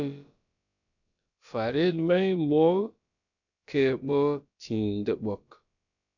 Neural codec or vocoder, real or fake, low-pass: codec, 16 kHz, about 1 kbps, DyCAST, with the encoder's durations; fake; 7.2 kHz